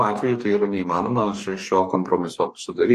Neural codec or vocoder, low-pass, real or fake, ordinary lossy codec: codec, 44.1 kHz, 2.6 kbps, DAC; 14.4 kHz; fake; AAC, 64 kbps